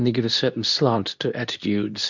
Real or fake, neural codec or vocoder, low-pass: fake; codec, 24 kHz, 0.9 kbps, WavTokenizer, medium speech release version 2; 7.2 kHz